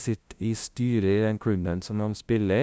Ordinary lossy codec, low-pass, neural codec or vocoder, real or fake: none; none; codec, 16 kHz, 0.5 kbps, FunCodec, trained on LibriTTS, 25 frames a second; fake